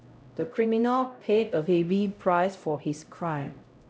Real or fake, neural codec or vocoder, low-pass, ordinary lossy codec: fake; codec, 16 kHz, 0.5 kbps, X-Codec, HuBERT features, trained on LibriSpeech; none; none